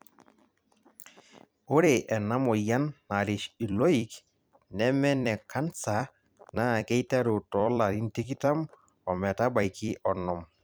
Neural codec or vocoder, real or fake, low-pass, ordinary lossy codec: vocoder, 44.1 kHz, 128 mel bands every 256 samples, BigVGAN v2; fake; none; none